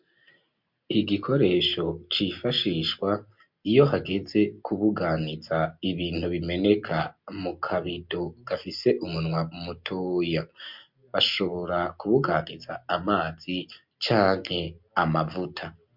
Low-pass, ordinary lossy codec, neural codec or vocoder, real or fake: 5.4 kHz; MP3, 48 kbps; none; real